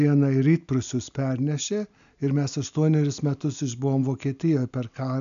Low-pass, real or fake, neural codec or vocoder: 7.2 kHz; real; none